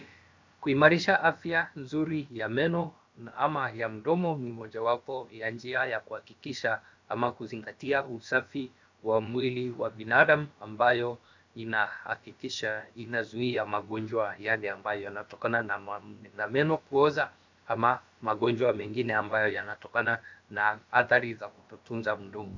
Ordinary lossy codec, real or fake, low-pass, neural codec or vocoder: MP3, 64 kbps; fake; 7.2 kHz; codec, 16 kHz, about 1 kbps, DyCAST, with the encoder's durations